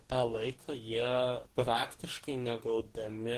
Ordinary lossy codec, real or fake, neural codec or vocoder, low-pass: Opus, 16 kbps; fake; codec, 44.1 kHz, 2.6 kbps, DAC; 14.4 kHz